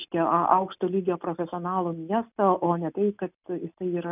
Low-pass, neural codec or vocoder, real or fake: 3.6 kHz; none; real